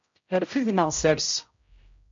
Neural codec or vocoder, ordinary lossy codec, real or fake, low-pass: codec, 16 kHz, 0.5 kbps, X-Codec, HuBERT features, trained on general audio; MP3, 48 kbps; fake; 7.2 kHz